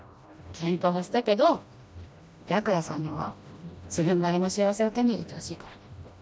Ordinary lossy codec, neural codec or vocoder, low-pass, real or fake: none; codec, 16 kHz, 1 kbps, FreqCodec, smaller model; none; fake